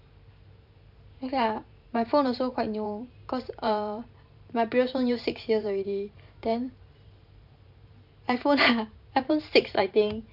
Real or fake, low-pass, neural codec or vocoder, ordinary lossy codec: fake; 5.4 kHz; vocoder, 44.1 kHz, 128 mel bands every 512 samples, BigVGAN v2; none